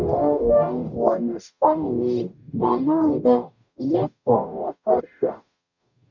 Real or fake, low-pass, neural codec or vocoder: fake; 7.2 kHz; codec, 44.1 kHz, 0.9 kbps, DAC